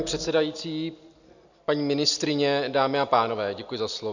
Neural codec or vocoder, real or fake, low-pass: none; real; 7.2 kHz